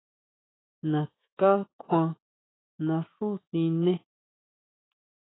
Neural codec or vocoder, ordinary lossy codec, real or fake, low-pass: autoencoder, 48 kHz, 128 numbers a frame, DAC-VAE, trained on Japanese speech; AAC, 16 kbps; fake; 7.2 kHz